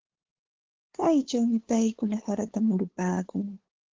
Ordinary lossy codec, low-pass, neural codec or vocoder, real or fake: Opus, 16 kbps; 7.2 kHz; codec, 16 kHz, 2 kbps, FunCodec, trained on LibriTTS, 25 frames a second; fake